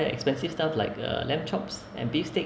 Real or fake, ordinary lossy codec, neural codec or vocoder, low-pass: real; none; none; none